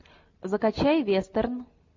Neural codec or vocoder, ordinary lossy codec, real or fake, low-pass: none; MP3, 48 kbps; real; 7.2 kHz